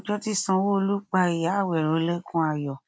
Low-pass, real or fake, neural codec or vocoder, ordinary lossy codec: none; real; none; none